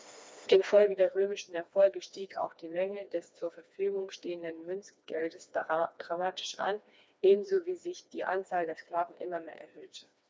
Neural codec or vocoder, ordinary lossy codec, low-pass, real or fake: codec, 16 kHz, 2 kbps, FreqCodec, smaller model; none; none; fake